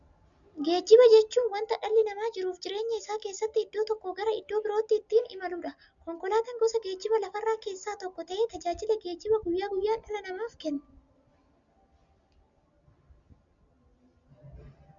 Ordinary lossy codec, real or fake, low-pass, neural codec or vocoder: MP3, 96 kbps; real; 7.2 kHz; none